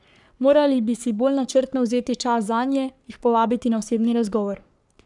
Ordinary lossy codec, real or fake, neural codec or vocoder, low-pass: none; fake; codec, 44.1 kHz, 3.4 kbps, Pupu-Codec; 10.8 kHz